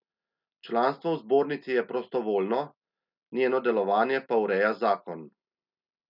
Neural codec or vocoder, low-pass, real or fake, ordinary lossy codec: none; 5.4 kHz; real; none